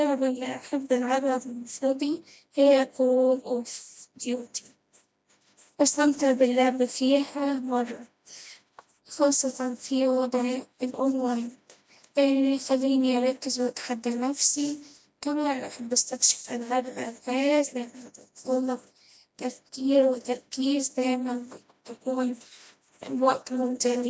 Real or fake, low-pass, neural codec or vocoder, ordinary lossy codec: fake; none; codec, 16 kHz, 1 kbps, FreqCodec, smaller model; none